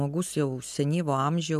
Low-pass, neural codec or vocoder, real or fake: 14.4 kHz; none; real